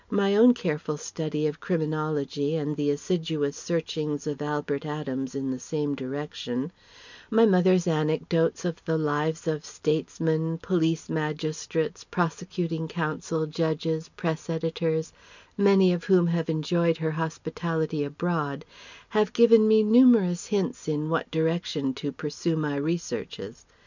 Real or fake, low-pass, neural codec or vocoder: real; 7.2 kHz; none